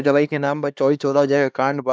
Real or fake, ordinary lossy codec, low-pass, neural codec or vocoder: fake; none; none; codec, 16 kHz, 2 kbps, X-Codec, HuBERT features, trained on LibriSpeech